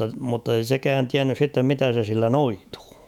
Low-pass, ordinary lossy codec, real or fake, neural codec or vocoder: 19.8 kHz; none; fake; autoencoder, 48 kHz, 128 numbers a frame, DAC-VAE, trained on Japanese speech